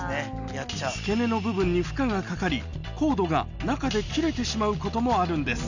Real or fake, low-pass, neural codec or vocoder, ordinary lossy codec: real; 7.2 kHz; none; none